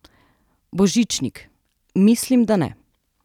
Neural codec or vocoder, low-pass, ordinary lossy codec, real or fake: none; 19.8 kHz; none; real